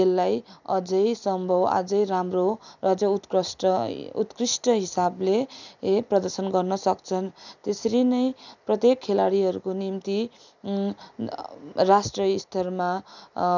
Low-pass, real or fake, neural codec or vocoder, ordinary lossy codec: 7.2 kHz; real; none; none